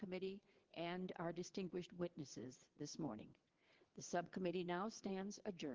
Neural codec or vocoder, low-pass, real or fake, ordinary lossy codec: vocoder, 22.05 kHz, 80 mel bands, WaveNeXt; 7.2 kHz; fake; Opus, 16 kbps